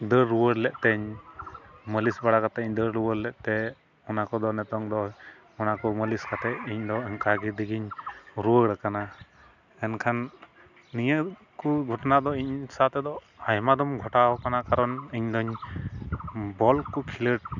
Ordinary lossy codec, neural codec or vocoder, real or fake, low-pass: none; none; real; 7.2 kHz